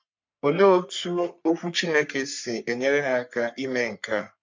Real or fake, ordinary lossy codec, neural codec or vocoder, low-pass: fake; MP3, 48 kbps; codec, 44.1 kHz, 3.4 kbps, Pupu-Codec; 7.2 kHz